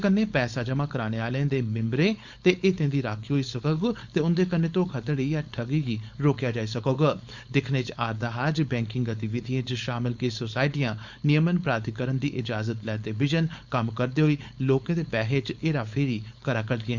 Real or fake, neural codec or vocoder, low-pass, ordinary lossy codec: fake; codec, 16 kHz, 4.8 kbps, FACodec; 7.2 kHz; Opus, 64 kbps